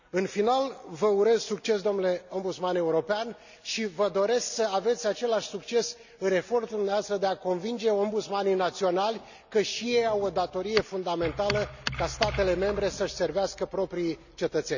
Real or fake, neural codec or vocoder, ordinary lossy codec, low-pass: real; none; none; 7.2 kHz